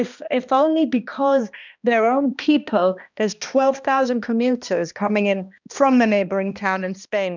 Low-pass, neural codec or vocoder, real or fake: 7.2 kHz; codec, 16 kHz, 1 kbps, X-Codec, HuBERT features, trained on balanced general audio; fake